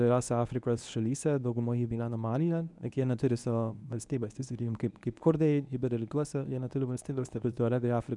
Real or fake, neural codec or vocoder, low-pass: fake; codec, 24 kHz, 0.9 kbps, WavTokenizer, medium speech release version 1; 10.8 kHz